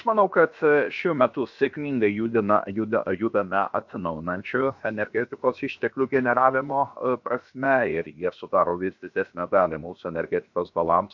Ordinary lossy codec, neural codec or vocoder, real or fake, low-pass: MP3, 64 kbps; codec, 16 kHz, about 1 kbps, DyCAST, with the encoder's durations; fake; 7.2 kHz